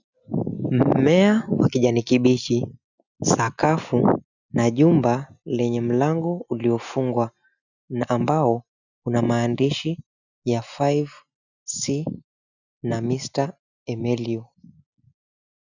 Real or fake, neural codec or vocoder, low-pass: real; none; 7.2 kHz